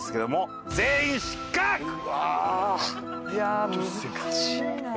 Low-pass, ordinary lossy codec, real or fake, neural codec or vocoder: none; none; real; none